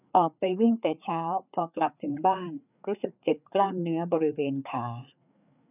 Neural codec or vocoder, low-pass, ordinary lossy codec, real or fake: codec, 16 kHz, 4 kbps, FreqCodec, larger model; 3.6 kHz; none; fake